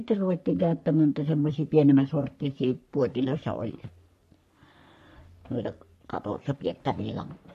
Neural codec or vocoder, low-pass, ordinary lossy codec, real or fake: codec, 44.1 kHz, 3.4 kbps, Pupu-Codec; 14.4 kHz; MP3, 64 kbps; fake